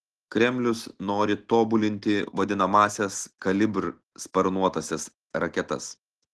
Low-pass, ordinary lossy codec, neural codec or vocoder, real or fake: 10.8 kHz; Opus, 16 kbps; none; real